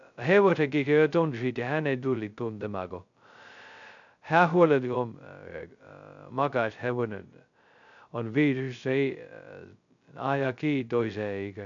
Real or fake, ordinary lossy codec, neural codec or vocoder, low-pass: fake; none; codec, 16 kHz, 0.2 kbps, FocalCodec; 7.2 kHz